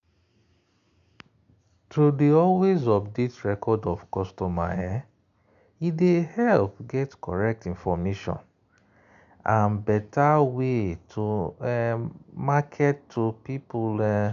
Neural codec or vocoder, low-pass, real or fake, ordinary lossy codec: none; 7.2 kHz; real; AAC, 96 kbps